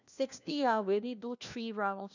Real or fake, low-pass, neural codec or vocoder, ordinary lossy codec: fake; 7.2 kHz; codec, 16 kHz, 1 kbps, FunCodec, trained on LibriTTS, 50 frames a second; none